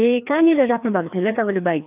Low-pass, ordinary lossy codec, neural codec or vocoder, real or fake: 3.6 kHz; AAC, 32 kbps; codec, 16 kHz, 2 kbps, FreqCodec, larger model; fake